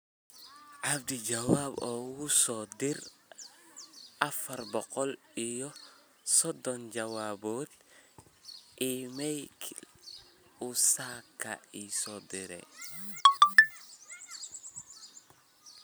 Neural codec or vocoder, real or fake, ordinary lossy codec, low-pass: none; real; none; none